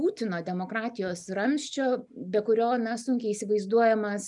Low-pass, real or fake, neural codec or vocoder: 10.8 kHz; real; none